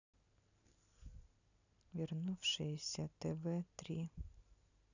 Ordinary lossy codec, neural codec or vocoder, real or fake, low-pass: none; none; real; 7.2 kHz